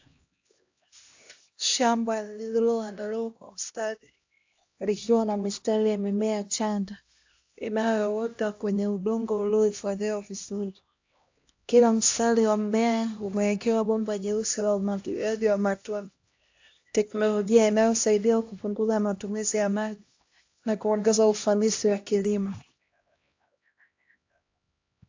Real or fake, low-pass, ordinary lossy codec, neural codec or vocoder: fake; 7.2 kHz; AAC, 48 kbps; codec, 16 kHz, 1 kbps, X-Codec, HuBERT features, trained on LibriSpeech